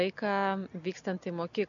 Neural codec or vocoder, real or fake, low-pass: none; real; 7.2 kHz